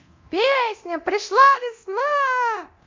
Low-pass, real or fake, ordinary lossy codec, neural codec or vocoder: 7.2 kHz; fake; MP3, 48 kbps; codec, 24 kHz, 0.9 kbps, DualCodec